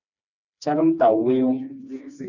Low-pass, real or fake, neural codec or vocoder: 7.2 kHz; fake; codec, 16 kHz, 2 kbps, FreqCodec, smaller model